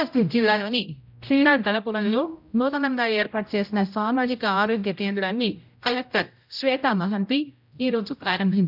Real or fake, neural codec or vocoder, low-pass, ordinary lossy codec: fake; codec, 16 kHz, 0.5 kbps, X-Codec, HuBERT features, trained on general audio; 5.4 kHz; none